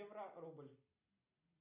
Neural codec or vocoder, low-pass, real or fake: none; 3.6 kHz; real